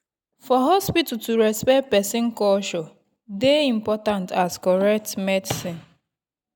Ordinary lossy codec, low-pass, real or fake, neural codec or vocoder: none; 19.8 kHz; real; none